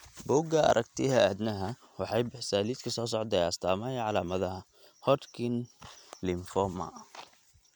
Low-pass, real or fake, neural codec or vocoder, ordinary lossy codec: 19.8 kHz; real; none; none